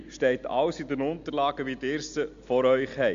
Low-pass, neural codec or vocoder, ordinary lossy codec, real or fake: 7.2 kHz; none; Opus, 64 kbps; real